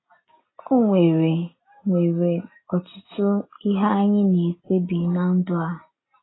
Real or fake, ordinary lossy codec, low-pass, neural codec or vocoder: real; AAC, 16 kbps; 7.2 kHz; none